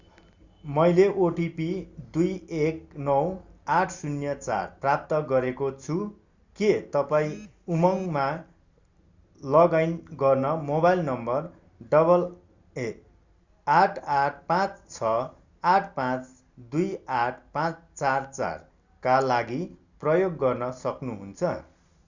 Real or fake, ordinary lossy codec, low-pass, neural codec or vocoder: real; Opus, 64 kbps; 7.2 kHz; none